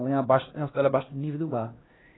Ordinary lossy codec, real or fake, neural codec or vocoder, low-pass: AAC, 16 kbps; fake; codec, 16 kHz, 1 kbps, X-Codec, HuBERT features, trained on LibriSpeech; 7.2 kHz